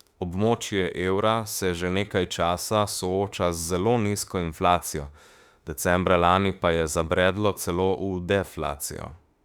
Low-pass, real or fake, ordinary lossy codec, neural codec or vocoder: 19.8 kHz; fake; Opus, 64 kbps; autoencoder, 48 kHz, 32 numbers a frame, DAC-VAE, trained on Japanese speech